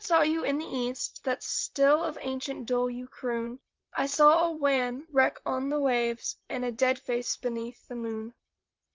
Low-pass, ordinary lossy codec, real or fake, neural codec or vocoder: 7.2 kHz; Opus, 24 kbps; fake; vocoder, 44.1 kHz, 128 mel bands, Pupu-Vocoder